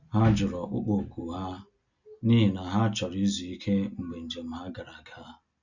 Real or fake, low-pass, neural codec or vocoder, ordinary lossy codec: real; 7.2 kHz; none; Opus, 64 kbps